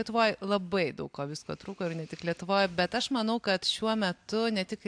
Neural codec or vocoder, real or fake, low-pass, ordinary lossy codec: none; real; 9.9 kHz; AAC, 64 kbps